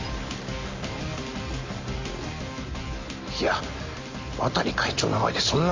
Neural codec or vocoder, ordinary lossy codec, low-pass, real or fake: none; MP3, 32 kbps; 7.2 kHz; real